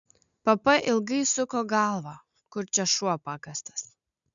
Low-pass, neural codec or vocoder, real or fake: 7.2 kHz; none; real